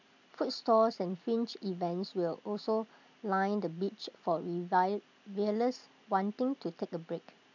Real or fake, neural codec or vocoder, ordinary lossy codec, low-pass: real; none; none; 7.2 kHz